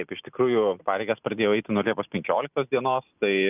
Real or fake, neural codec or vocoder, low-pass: real; none; 3.6 kHz